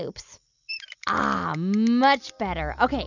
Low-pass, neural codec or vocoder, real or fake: 7.2 kHz; none; real